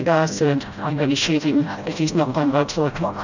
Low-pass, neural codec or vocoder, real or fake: 7.2 kHz; codec, 16 kHz, 0.5 kbps, FreqCodec, smaller model; fake